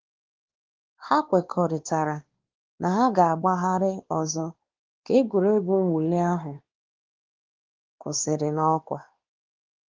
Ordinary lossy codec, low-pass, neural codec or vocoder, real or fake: Opus, 16 kbps; 7.2 kHz; codec, 16 kHz, 2 kbps, X-Codec, WavLM features, trained on Multilingual LibriSpeech; fake